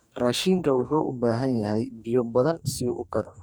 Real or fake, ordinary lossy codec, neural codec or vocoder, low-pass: fake; none; codec, 44.1 kHz, 2.6 kbps, DAC; none